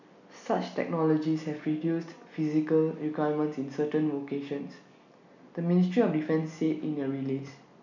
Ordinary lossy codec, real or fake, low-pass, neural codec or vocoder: none; real; 7.2 kHz; none